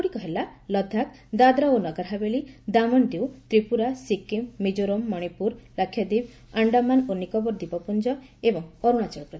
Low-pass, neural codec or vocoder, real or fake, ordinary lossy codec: none; none; real; none